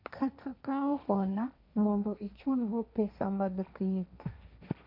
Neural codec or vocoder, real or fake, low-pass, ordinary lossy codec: codec, 16 kHz, 1.1 kbps, Voila-Tokenizer; fake; 5.4 kHz; MP3, 48 kbps